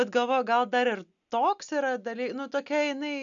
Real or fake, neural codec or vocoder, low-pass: real; none; 7.2 kHz